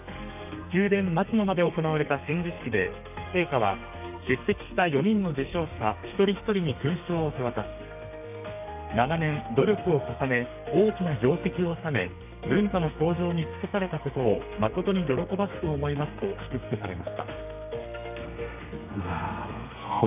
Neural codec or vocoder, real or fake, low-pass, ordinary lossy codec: codec, 32 kHz, 1.9 kbps, SNAC; fake; 3.6 kHz; none